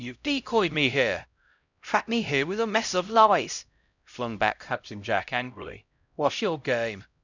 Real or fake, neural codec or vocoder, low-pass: fake; codec, 16 kHz, 0.5 kbps, X-Codec, HuBERT features, trained on LibriSpeech; 7.2 kHz